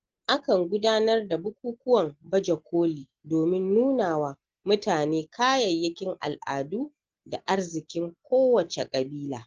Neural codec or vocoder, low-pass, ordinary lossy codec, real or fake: none; 7.2 kHz; Opus, 16 kbps; real